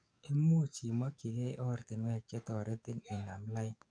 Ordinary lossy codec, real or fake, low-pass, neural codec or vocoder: none; fake; 9.9 kHz; codec, 44.1 kHz, 7.8 kbps, DAC